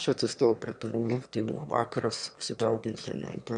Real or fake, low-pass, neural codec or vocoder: fake; 9.9 kHz; autoencoder, 22.05 kHz, a latent of 192 numbers a frame, VITS, trained on one speaker